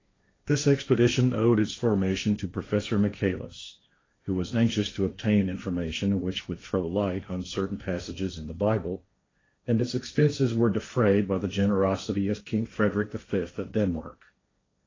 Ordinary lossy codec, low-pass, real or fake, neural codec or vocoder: AAC, 32 kbps; 7.2 kHz; fake; codec, 16 kHz, 1.1 kbps, Voila-Tokenizer